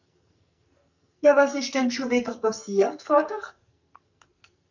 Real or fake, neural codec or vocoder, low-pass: fake; codec, 44.1 kHz, 2.6 kbps, SNAC; 7.2 kHz